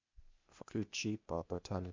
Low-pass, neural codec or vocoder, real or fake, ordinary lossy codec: 7.2 kHz; codec, 16 kHz, 0.8 kbps, ZipCodec; fake; MP3, 64 kbps